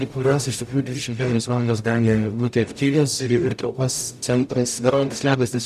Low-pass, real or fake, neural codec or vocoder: 14.4 kHz; fake; codec, 44.1 kHz, 0.9 kbps, DAC